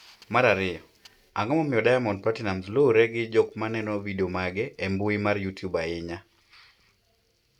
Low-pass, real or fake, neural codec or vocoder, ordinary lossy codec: 19.8 kHz; real; none; none